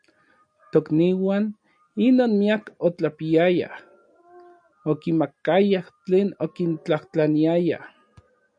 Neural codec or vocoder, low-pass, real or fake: none; 9.9 kHz; real